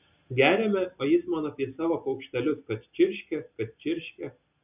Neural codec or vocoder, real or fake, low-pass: none; real; 3.6 kHz